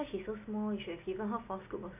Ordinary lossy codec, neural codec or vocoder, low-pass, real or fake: none; none; 3.6 kHz; real